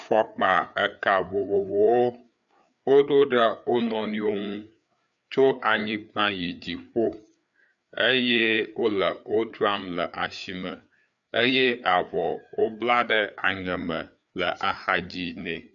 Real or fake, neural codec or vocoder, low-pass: fake; codec, 16 kHz, 4 kbps, FreqCodec, larger model; 7.2 kHz